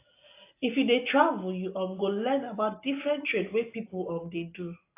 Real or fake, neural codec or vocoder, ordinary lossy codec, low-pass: real; none; AAC, 24 kbps; 3.6 kHz